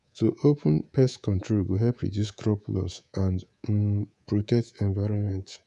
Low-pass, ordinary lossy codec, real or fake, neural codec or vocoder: 10.8 kHz; none; fake; codec, 24 kHz, 3.1 kbps, DualCodec